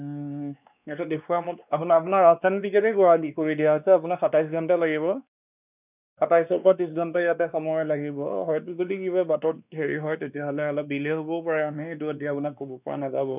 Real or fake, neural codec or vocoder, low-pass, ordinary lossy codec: fake; codec, 16 kHz, 2 kbps, X-Codec, WavLM features, trained on Multilingual LibriSpeech; 3.6 kHz; none